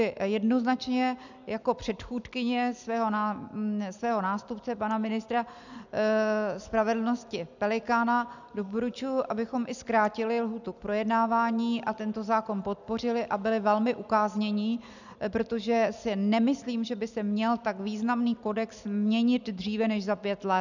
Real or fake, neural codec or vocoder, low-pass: real; none; 7.2 kHz